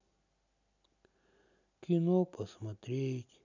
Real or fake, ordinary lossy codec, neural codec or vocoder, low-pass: real; none; none; 7.2 kHz